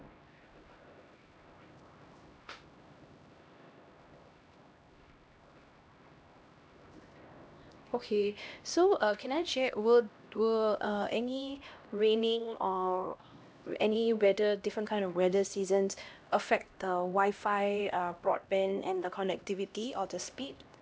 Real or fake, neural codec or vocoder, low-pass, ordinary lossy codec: fake; codec, 16 kHz, 1 kbps, X-Codec, HuBERT features, trained on LibriSpeech; none; none